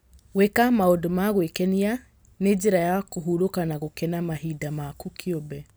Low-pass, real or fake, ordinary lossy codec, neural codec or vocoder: none; real; none; none